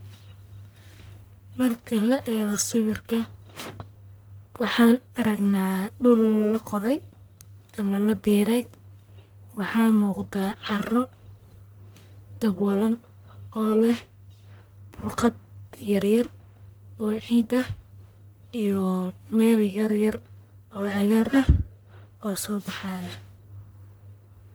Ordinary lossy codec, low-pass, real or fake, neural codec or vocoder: none; none; fake; codec, 44.1 kHz, 1.7 kbps, Pupu-Codec